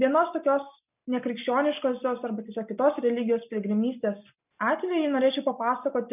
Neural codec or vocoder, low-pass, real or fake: none; 3.6 kHz; real